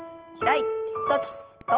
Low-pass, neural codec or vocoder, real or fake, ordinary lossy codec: 3.6 kHz; none; real; Opus, 16 kbps